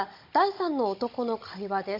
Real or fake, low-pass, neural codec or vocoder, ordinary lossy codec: fake; 5.4 kHz; codec, 16 kHz, 16 kbps, FunCodec, trained on Chinese and English, 50 frames a second; MP3, 32 kbps